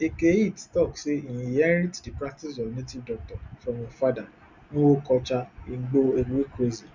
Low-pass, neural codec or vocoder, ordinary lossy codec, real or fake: 7.2 kHz; none; Opus, 64 kbps; real